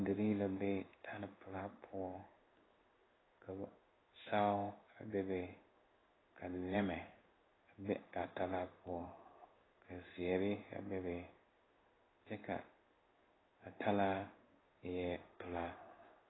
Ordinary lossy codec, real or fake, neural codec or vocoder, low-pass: AAC, 16 kbps; fake; codec, 16 kHz in and 24 kHz out, 1 kbps, XY-Tokenizer; 7.2 kHz